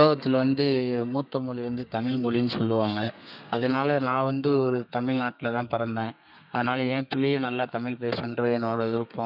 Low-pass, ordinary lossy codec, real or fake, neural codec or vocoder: 5.4 kHz; none; fake; codec, 32 kHz, 1.9 kbps, SNAC